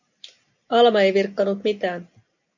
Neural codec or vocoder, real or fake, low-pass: none; real; 7.2 kHz